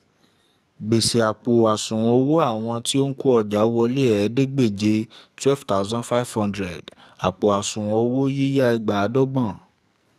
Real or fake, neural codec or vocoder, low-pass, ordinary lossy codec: fake; codec, 44.1 kHz, 2.6 kbps, SNAC; 14.4 kHz; none